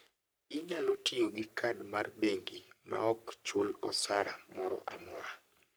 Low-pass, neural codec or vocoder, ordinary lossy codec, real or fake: none; codec, 44.1 kHz, 3.4 kbps, Pupu-Codec; none; fake